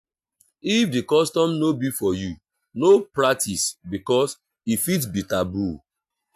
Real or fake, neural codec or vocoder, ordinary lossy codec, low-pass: real; none; AAC, 96 kbps; 14.4 kHz